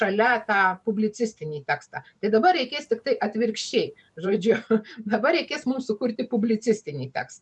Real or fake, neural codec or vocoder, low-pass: fake; vocoder, 44.1 kHz, 128 mel bands every 512 samples, BigVGAN v2; 10.8 kHz